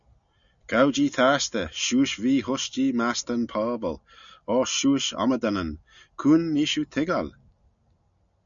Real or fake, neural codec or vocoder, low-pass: real; none; 7.2 kHz